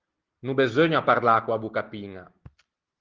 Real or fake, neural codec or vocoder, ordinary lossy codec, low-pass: real; none; Opus, 16 kbps; 7.2 kHz